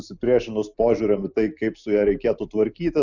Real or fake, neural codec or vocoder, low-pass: real; none; 7.2 kHz